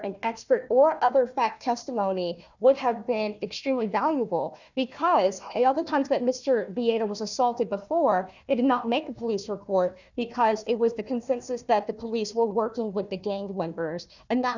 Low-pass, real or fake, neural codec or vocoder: 7.2 kHz; fake; codec, 16 kHz, 1 kbps, FunCodec, trained on Chinese and English, 50 frames a second